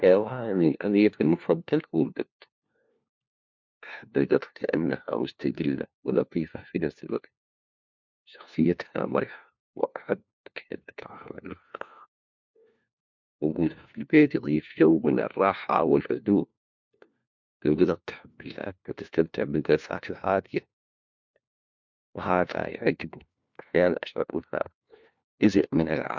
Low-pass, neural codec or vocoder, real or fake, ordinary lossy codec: 7.2 kHz; codec, 16 kHz, 1 kbps, FunCodec, trained on LibriTTS, 50 frames a second; fake; MP3, 64 kbps